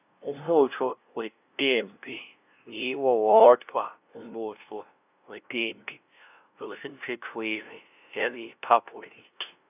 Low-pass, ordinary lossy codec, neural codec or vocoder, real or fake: 3.6 kHz; none; codec, 16 kHz, 0.5 kbps, FunCodec, trained on LibriTTS, 25 frames a second; fake